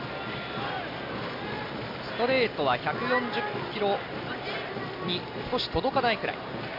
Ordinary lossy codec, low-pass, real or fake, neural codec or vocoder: MP3, 48 kbps; 5.4 kHz; real; none